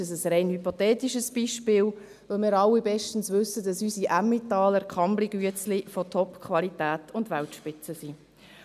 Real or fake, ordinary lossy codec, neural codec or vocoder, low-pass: real; none; none; 14.4 kHz